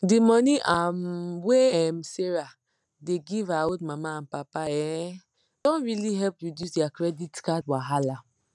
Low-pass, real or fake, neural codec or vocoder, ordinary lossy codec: 10.8 kHz; real; none; none